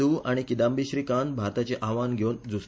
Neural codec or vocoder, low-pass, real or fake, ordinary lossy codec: none; none; real; none